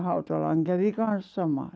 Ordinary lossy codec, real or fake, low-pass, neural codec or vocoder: none; real; none; none